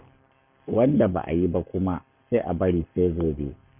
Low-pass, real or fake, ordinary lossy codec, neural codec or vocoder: 3.6 kHz; fake; none; codec, 44.1 kHz, 7.8 kbps, Pupu-Codec